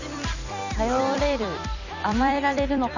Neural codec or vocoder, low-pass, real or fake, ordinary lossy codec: vocoder, 44.1 kHz, 128 mel bands every 256 samples, BigVGAN v2; 7.2 kHz; fake; none